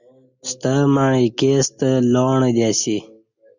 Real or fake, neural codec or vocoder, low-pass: real; none; 7.2 kHz